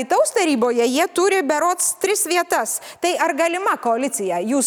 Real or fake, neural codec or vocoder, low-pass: real; none; 19.8 kHz